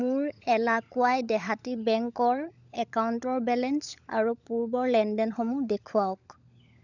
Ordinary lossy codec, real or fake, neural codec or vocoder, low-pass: none; fake; codec, 16 kHz, 16 kbps, FunCodec, trained on LibriTTS, 50 frames a second; 7.2 kHz